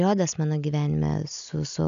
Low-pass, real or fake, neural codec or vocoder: 7.2 kHz; real; none